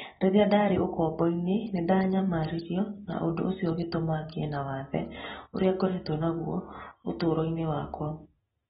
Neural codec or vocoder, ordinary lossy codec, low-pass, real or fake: none; AAC, 16 kbps; 19.8 kHz; real